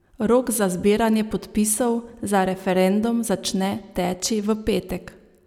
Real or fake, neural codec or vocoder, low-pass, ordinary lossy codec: real; none; 19.8 kHz; none